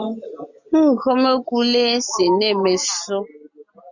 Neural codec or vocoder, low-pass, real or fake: none; 7.2 kHz; real